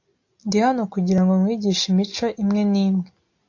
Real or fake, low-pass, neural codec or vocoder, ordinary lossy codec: real; 7.2 kHz; none; AAC, 48 kbps